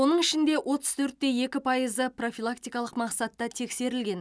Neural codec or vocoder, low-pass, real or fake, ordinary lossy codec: none; none; real; none